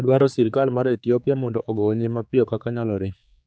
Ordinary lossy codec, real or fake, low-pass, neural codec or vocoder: none; fake; none; codec, 16 kHz, 4 kbps, X-Codec, HuBERT features, trained on general audio